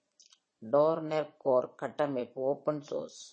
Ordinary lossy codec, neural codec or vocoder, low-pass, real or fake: MP3, 32 kbps; vocoder, 22.05 kHz, 80 mel bands, Vocos; 9.9 kHz; fake